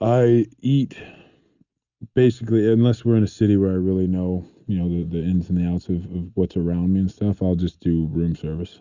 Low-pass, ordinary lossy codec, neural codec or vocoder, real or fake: 7.2 kHz; Opus, 64 kbps; none; real